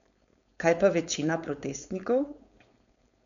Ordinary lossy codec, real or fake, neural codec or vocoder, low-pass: none; fake; codec, 16 kHz, 4.8 kbps, FACodec; 7.2 kHz